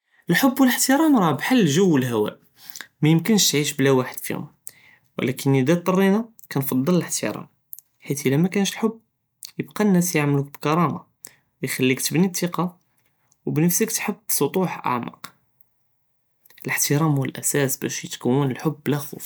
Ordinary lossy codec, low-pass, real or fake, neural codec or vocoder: none; none; real; none